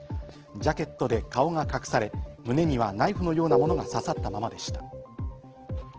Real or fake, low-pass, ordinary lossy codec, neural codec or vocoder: real; 7.2 kHz; Opus, 16 kbps; none